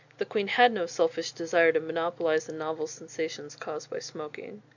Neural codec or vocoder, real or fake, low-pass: none; real; 7.2 kHz